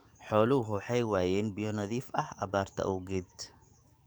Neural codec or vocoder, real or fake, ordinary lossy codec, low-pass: codec, 44.1 kHz, 7.8 kbps, DAC; fake; none; none